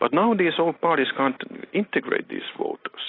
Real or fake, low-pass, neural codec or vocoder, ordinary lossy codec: real; 5.4 kHz; none; AAC, 24 kbps